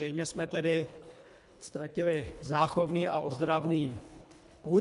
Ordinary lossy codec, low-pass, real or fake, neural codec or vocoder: MP3, 64 kbps; 10.8 kHz; fake; codec, 24 kHz, 1.5 kbps, HILCodec